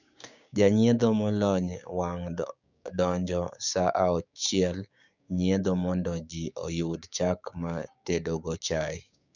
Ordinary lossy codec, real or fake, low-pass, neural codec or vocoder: none; fake; 7.2 kHz; codec, 16 kHz, 6 kbps, DAC